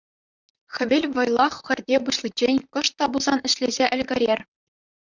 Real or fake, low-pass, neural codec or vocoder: fake; 7.2 kHz; vocoder, 44.1 kHz, 128 mel bands, Pupu-Vocoder